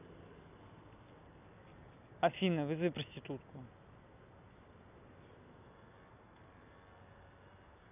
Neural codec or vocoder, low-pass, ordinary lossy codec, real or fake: none; 3.6 kHz; none; real